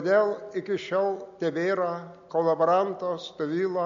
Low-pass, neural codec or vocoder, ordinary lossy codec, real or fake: 7.2 kHz; none; MP3, 48 kbps; real